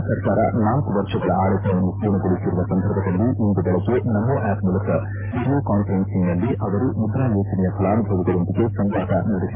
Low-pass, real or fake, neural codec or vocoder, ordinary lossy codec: 3.6 kHz; fake; autoencoder, 48 kHz, 128 numbers a frame, DAC-VAE, trained on Japanese speech; MP3, 24 kbps